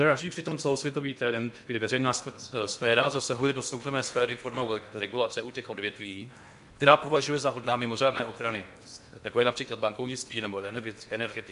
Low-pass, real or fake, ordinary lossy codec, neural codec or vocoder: 10.8 kHz; fake; MP3, 64 kbps; codec, 16 kHz in and 24 kHz out, 0.8 kbps, FocalCodec, streaming, 65536 codes